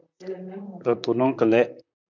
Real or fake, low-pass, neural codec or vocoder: fake; 7.2 kHz; vocoder, 44.1 kHz, 128 mel bands, Pupu-Vocoder